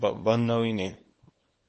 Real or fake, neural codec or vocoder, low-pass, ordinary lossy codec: fake; codec, 24 kHz, 0.9 kbps, WavTokenizer, small release; 10.8 kHz; MP3, 32 kbps